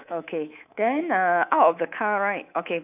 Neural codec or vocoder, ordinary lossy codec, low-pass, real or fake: codec, 24 kHz, 3.1 kbps, DualCodec; none; 3.6 kHz; fake